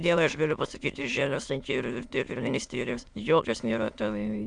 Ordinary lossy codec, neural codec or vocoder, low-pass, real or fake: Opus, 64 kbps; autoencoder, 22.05 kHz, a latent of 192 numbers a frame, VITS, trained on many speakers; 9.9 kHz; fake